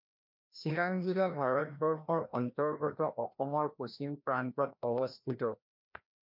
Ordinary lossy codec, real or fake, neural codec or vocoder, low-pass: MP3, 48 kbps; fake; codec, 16 kHz, 1 kbps, FreqCodec, larger model; 5.4 kHz